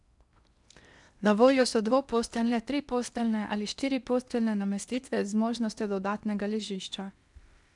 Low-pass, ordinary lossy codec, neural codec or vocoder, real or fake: 10.8 kHz; none; codec, 16 kHz in and 24 kHz out, 0.8 kbps, FocalCodec, streaming, 65536 codes; fake